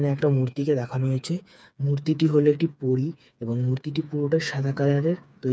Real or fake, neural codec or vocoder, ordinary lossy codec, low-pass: fake; codec, 16 kHz, 4 kbps, FreqCodec, smaller model; none; none